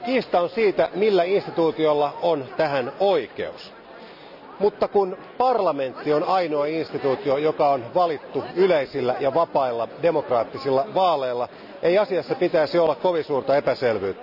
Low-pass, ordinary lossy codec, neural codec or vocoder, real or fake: 5.4 kHz; none; none; real